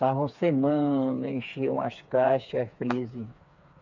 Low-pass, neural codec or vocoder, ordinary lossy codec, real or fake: 7.2 kHz; codec, 16 kHz, 4 kbps, FreqCodec, smaller model; none; fake